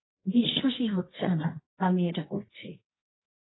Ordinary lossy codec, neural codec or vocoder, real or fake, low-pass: AAC, 16 kbps; codec, 24 kHz, 0.9 kbps, WavTokenizer, medium music audio release; fake; 7.2 kHz